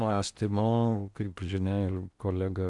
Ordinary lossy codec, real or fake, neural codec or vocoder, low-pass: MP3, 96 kbps; fake; codec, 16 kHz in and 24 kHz out, 0.8 kbps, FocalCodec, streaming, 65536 codes; 10.8 kHz